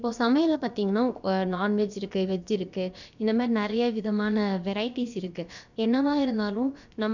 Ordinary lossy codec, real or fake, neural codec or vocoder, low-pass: none; fake; codec, 16 kHz, about 1 kbps, DyCAST, with the encoder's durations; 7.2 kHz